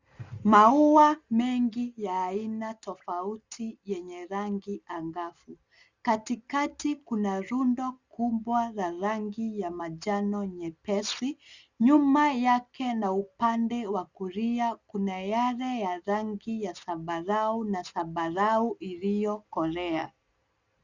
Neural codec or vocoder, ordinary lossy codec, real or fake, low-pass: none; Opus, 64 kbps; real; 7.2 kHz